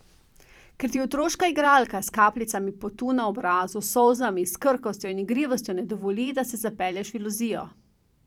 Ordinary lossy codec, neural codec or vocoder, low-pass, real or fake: none; vocoder, 44.1 kHz, 128 mel bands every 512 samples, BigVGAN v2; 19.8 kHz; fake